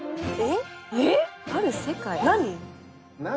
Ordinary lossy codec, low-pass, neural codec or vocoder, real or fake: none; none; none; real